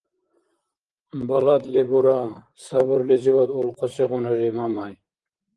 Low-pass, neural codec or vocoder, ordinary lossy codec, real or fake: 10.8 kHz; vocoder, 44.1 kHz, 128 mel bands, Pupu-Vocoder; Opus, 24 kbps; fake